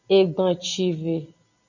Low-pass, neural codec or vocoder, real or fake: 7.2 kHz; none; real